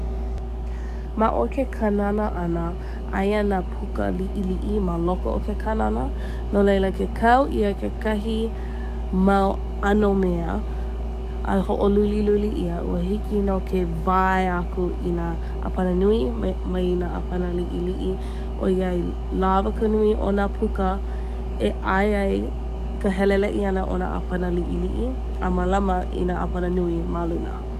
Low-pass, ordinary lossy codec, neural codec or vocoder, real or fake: 14.4 kHz; none; codec, 44.1 kHz, 7.8 kbps, DAC; fake